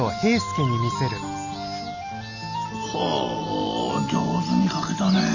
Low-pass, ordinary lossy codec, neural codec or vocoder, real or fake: 7.2 kHz; none; none; real